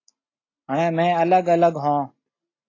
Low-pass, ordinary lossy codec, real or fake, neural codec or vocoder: 7.2 kHz; AAC, 32 kbps; real; none